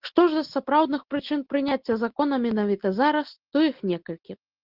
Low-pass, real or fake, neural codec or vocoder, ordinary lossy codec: 5.4 kHz; real; none; Opus, 16 kbps